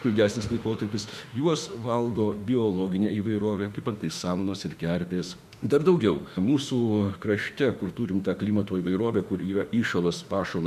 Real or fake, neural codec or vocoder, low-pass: fake; autoencoder, 48 kHz, 32 numbers a frame, DAC-VAE, trained on Japanese speech; 14.4 kHz